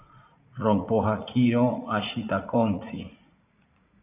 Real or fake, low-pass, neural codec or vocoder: fake; 3.6 kHz; vocoder, 44.1 kHz, 80 mel bands, Vocos